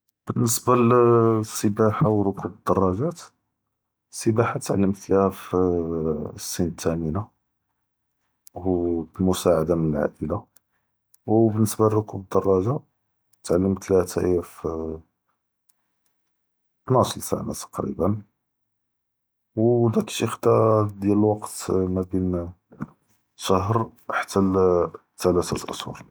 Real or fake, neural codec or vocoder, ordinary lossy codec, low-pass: real; none; none; none